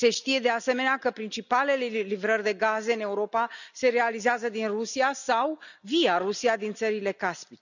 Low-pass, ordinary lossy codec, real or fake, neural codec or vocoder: 7.2 kHz; none; real; none